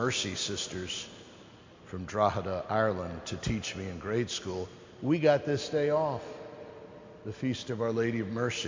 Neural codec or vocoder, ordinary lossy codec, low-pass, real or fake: none; MP3, 48 kbps; 7.2 kHz; real